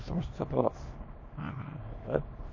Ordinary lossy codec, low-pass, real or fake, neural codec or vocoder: MP3, 32 kbps; 7.2 kHz; fake; codec, 24 kHz, 0.9 kbps, WavTokenizer, small release